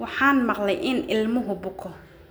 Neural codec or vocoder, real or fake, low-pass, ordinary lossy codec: none; real; none; none